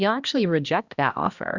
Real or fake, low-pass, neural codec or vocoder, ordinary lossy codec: fake; 7.2 kHz; codec, 16 kHz, 1 kbps, X-Codec, HuBERT features, trained on balanced general audio; Opus, 64 kbps